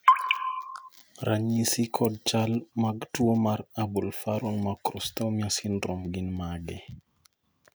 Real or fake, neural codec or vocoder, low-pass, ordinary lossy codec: real; none; none; none